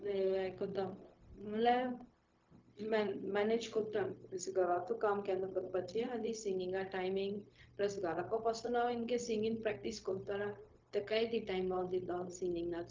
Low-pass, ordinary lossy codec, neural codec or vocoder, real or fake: 7.2 kHz; Opus, 24 kbps; codec, 16 kHz, 0.4 kbps, LongCat-Audio-Codec; fake